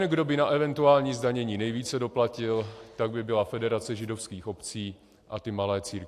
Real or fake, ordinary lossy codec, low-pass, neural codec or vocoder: real; AAC, 64 kbps; 14.4 kHz; none